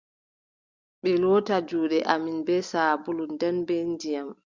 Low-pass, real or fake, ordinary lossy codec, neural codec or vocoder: 7.2 kHz; real; Opus, 64 kbps; none